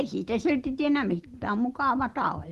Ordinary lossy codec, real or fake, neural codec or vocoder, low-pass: Opus, 16 kbps; real; none; 14.4 kHz